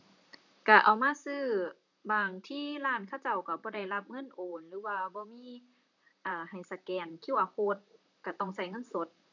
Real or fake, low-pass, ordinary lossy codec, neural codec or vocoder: fake; 7.2 kHz; none; vocoder, 44.1 kHz, 128 mel bands, Pupu-Vocoder